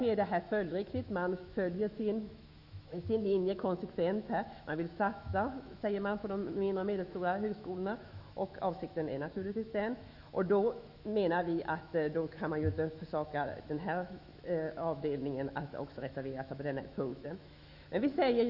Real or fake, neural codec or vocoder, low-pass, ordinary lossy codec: fake; autoencoder, 48 kHz, 128 numbers a frame, DAC-VAE, trained on Japanese speech; 5.4 kHz; none